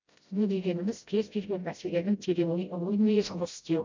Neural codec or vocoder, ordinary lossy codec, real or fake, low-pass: codec, 16 kHz, 0.5 kbps, FreqCodec, smaller model; MP3, 64 kbps; fake; 7.2 kHz